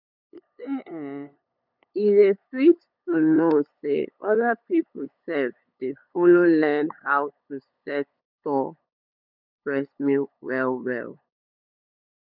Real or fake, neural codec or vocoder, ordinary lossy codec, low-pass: fake; codec, 16 kHz, 8 kbps, FunCodec, trained on LibriTTS, 25 frames a second; none; 5.4 kHz